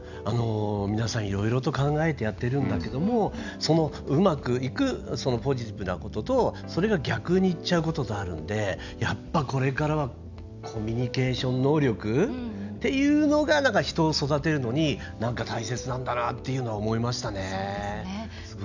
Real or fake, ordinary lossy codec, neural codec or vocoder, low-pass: real; none; none; 7.2 kHz